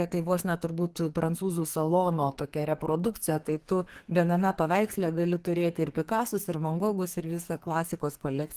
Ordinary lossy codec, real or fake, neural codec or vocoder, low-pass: Opus, 24 kbps; fake; codec, 44.1 kHz, 2.6 kbps, SNAC; 14.4 kHz